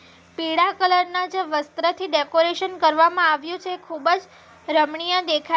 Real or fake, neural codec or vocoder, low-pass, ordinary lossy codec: real; none; none; none